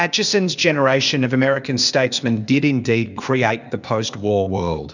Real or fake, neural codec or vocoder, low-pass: fake; codec, 16 kHz, 0.8 kbps, ZipCodec; 7.2 kHz